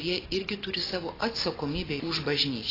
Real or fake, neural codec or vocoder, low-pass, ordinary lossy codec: real; none; 5.4 kHz; AAC, 24 kbps